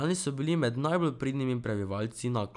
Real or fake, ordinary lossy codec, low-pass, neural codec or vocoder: real; none; 10.8 kHz; none